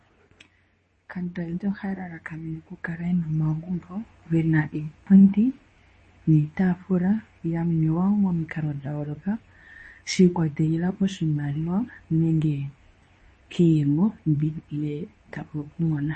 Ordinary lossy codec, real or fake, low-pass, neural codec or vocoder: MP3, 32 kbps; fake; 10.8 kHz; codec, 24 kHz, 0.9 kbps, WavTokenizer, medium speech release version 2